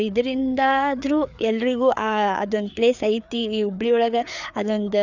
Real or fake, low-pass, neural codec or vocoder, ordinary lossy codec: fake; 7.2 kHz; codec, 16 kHz, 4 kbps, FreqCodec, larger model; none